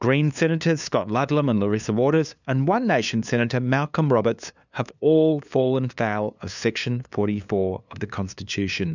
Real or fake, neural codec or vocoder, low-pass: fake; codec, 16 kHz, 2 kbps, FunCodec, trained on LibriTTS, 25 frames a second; 7.2 kHz